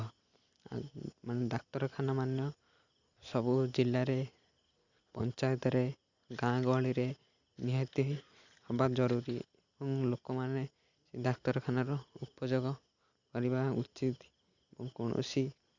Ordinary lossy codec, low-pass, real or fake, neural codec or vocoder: Opus, 64 kbps; 7.2 kHz; real; none